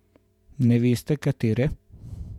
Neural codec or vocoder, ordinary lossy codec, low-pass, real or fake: vocoder, 48 kHz, 128 mel bands, Vocos; none; 19.8 kHz; fake